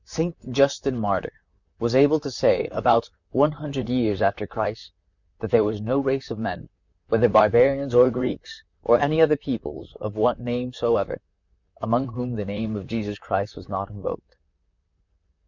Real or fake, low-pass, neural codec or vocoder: fake; 7.2 kHz; vocoder, 44.1 kHz, 128 mel bands, Pupu-Vocoder